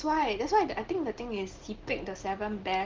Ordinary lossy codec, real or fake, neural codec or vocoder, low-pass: Opus, 16 kbps; real; none; 7.2 kHz